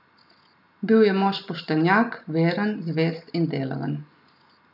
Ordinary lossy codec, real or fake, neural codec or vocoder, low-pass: none; real; none; 5.4 kHz